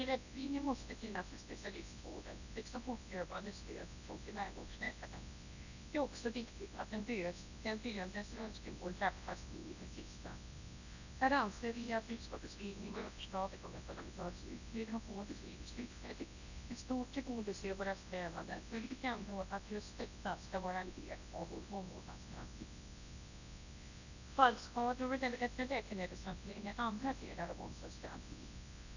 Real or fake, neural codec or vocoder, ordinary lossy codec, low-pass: fake; codec, 24 kHz, 0.9 kbps, WavTokenizer, large speech release; none; 7.2 kHz